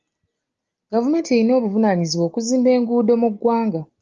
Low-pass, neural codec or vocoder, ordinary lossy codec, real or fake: 7.2 kHz; none; Opus, 32 kbps; real